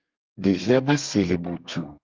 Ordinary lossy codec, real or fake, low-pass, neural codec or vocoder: Opus, 24 kbps; fake; 7.2 kHz; codec, 32 kHz, 1.9 kbps, SNAC